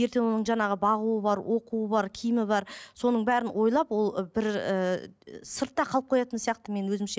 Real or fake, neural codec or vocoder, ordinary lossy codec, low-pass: real; none; none; none